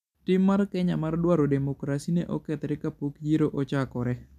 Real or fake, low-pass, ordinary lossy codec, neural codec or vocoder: real; 14.4 kHz; none; none